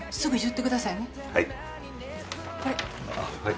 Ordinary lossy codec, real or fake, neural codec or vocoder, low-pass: none; real; none; none